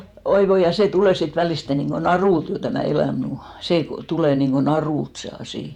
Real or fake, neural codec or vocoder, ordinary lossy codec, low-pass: fake; vocoder, 48 kHz, 128 mel bands, Vocos; none; 19.8 kHz